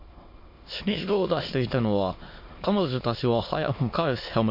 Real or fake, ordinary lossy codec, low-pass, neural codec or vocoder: fake; MP3, 32 kbps; 5.4 kHz; autoencoder, 22.05 kHz, a latent of 192 numbers a frame, VITS, trained on many speakers